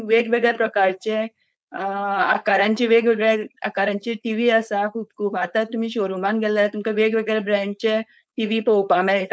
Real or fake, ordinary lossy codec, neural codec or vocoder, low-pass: fake; none; codec, 16 kHz, 4.8 kbps, FACodec; none